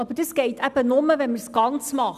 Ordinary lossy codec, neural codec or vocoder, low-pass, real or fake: none; none; 14.4 kHz; real